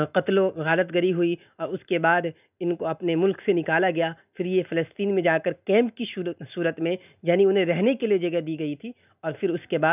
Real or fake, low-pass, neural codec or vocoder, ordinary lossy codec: real; 3.6 kHz; none; none